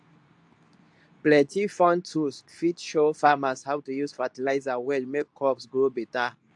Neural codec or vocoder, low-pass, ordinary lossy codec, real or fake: codec, 24 kHz, 0.9 kbps, WavTokenizer, medium speech release version 2; 10.8 kHz; AAC, 64 kbps; fake